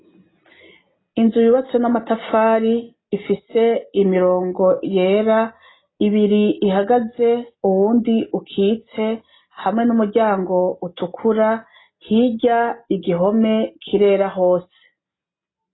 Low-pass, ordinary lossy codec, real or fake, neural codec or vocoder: 7.2 kHz; AAC, 16 kbps; real; none